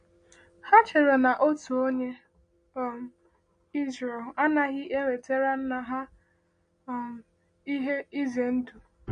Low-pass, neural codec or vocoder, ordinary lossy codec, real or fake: 9.9 kHz; none; MP3, 48 kbps; real